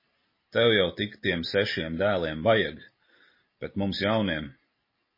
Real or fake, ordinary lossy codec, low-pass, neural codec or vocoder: real; MP3, 24 kbps; 5.4 kHz; none